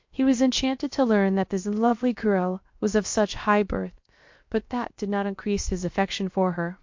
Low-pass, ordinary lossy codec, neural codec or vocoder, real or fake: 7.2 kHz; MP3, 48 kbps; codec, 16 kHz, 0.7 kbps, FocalCodec; fake